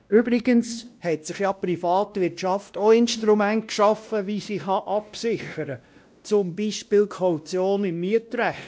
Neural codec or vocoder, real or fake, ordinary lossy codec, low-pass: codec, 16 kHz, 1 kbps, X-Codec, WavLM features, trained on Multilingual LibriSpeech; fake; none; none